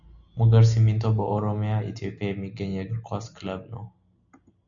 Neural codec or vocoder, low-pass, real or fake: none; 7.2 kHz; real